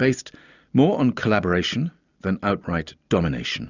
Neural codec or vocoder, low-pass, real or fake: none; 7.2 kHz; real